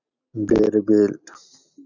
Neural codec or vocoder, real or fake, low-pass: none; real; 7.2 kHz